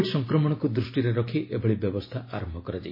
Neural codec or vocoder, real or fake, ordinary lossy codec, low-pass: none; real; MP3, 24 kbps; 5.4 kHz